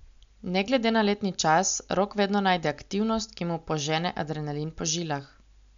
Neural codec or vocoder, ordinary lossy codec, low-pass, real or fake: none; MP3, 64 kbps; 7.2 kHz; real